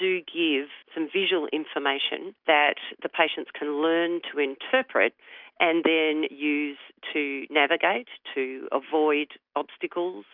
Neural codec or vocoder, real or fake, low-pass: none; real; 5.4 kHz